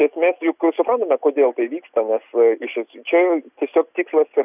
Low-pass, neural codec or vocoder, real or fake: 3.6 kHz; none; real